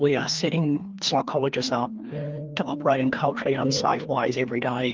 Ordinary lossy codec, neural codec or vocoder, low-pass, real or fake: Opus, 24 kbps; codec, 16 kHz, 2 kbps, FreqCodec, larger model; 7.2 kHz; fake